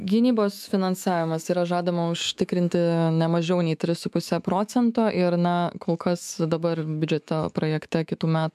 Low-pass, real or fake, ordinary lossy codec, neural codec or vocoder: 14.4 kHz; fake; MP3, 96 kbps; autoencoder, 48 kHz, 128 numbers a frame, DAC-VAE, trained on Japanese speech